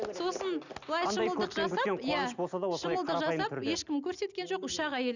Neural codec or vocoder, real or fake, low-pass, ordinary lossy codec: none; real; 7.2 kHz; none